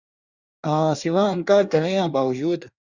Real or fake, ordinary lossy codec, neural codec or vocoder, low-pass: fake; Opus, 64 kbps; codec, 24 kHz, 1 kbps, SNAC; 7.2 kHz